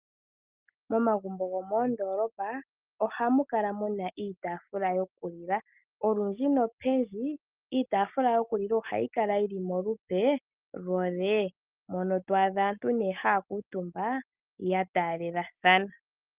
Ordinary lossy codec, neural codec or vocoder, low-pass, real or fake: Opus, 24 kbps; none; 3.6 kHz; real